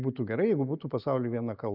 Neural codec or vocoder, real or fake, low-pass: none; real; 5.4 kHz